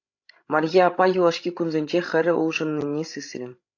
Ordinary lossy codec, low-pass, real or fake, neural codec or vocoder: AAC, 48 kbps; 7.2 kHz; fake; codec, 16 kHz, 16 kbps, FreqCodec, larger model